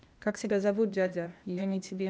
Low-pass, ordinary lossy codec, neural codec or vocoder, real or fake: none; none; codec, 16 kHz, 0.8 kbps, ZipCodec; fake